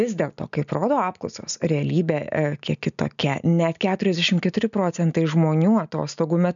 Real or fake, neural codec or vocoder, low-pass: real; none; 7.2 kHz